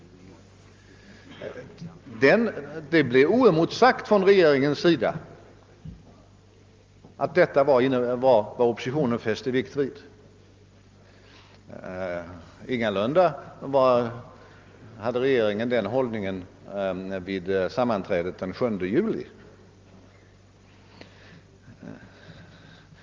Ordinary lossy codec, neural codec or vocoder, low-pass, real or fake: Opus, 32 kbps; none; 7.2 kHz; real